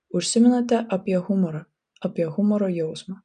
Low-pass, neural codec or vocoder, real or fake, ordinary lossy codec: 9.9 kHz; none; real; MP3, 96 kbps